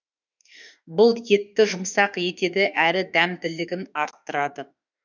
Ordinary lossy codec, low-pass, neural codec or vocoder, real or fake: none; 7.2 kHz; autoencoder, 48 kHz, 32 numbers a frame, DAC-VAE, trained on Japanese speech; fake